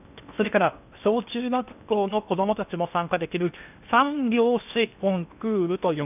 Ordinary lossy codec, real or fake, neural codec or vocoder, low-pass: none; fake; codec, 16 kHz in and 24 kHz out, 0.8 kbps, FocalCodec, streaming, 65536 codes; 3.6 kHz